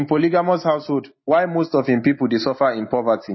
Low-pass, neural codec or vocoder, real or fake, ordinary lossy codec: 7.2 kHz; none; real; MP3, 24 kbps